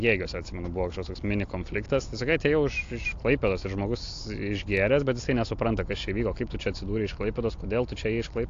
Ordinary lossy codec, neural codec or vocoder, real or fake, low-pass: AAC, 48 kbps; none; real; 7.2 kHz